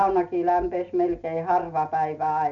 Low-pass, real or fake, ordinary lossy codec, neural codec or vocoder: 7.2 kHz; real; none; none